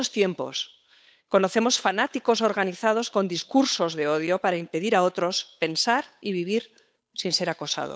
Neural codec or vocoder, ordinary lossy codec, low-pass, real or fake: codec, 16 kHz, 8 kbps, FunCodec, trained on Chinese and English, 25 frames a second; none; none; fake